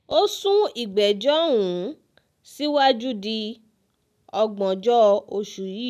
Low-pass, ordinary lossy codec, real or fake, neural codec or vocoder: 14.4 kHz; none; real; none